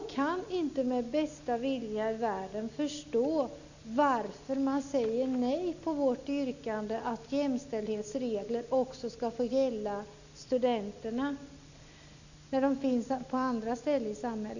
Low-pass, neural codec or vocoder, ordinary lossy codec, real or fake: 7.2 kHz; none; none; real